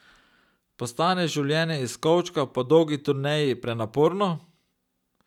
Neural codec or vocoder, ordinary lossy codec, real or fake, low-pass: none; none; real; 19.8 kHz